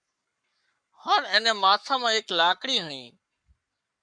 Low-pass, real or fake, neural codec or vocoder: 9.9 kHz; fake; codec, 44.1 kHz, 7.8 kbps, Pupu-Codec